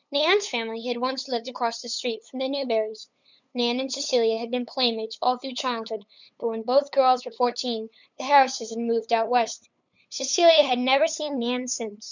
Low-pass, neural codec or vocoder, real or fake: 7.2 kHz; codec, 16 kHz, 8 kbps, FunCodec, trained on LibriTTS, 25 frames a second; fake